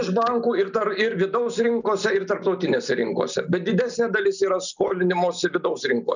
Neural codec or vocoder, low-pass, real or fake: none; 7.2 kHz; real